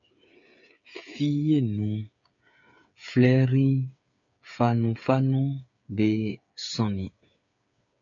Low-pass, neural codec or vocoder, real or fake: 7.2 kHz; codec, 16 kHz, 8 kbps, FreqCodec, smaller model; fake